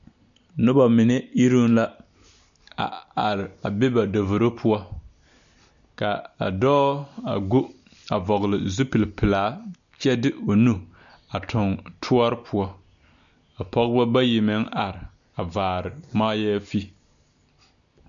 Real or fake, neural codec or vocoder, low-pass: real; none; 7.2 kHz